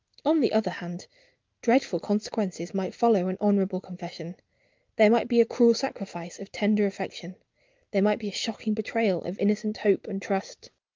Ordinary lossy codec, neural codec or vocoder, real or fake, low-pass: Opus, 24 kbps; none; real; 7.2 kHz